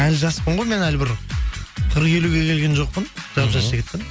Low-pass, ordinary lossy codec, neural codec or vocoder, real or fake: none; none; none; real